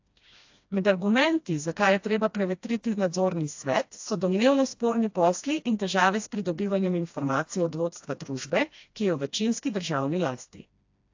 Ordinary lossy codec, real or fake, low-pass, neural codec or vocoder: AAC, 48 kbps; fake; 7.2 kHz; codec, 16 kHz, 1 kbps, FreqCodec, smaller model